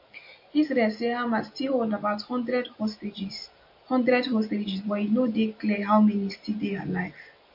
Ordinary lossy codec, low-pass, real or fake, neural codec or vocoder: MP3, 32 kbps; 5.4 kHz; real; none